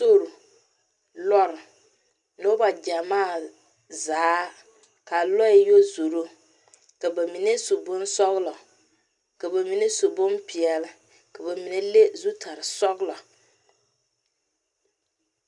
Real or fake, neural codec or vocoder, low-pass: real; none; 10.8 kHz